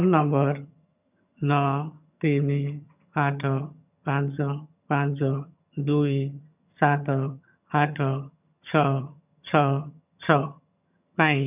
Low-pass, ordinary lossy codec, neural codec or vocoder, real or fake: 3.6 kHz; none; vocoder, 22.05 kHz, 80 mel bands, HiFi-GAN; fake